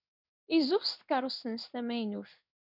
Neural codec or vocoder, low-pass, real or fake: codec, 16 kHz in and 24 kHz out, 1 kbps, XY-Tokenizer; 5.4 kHz; fake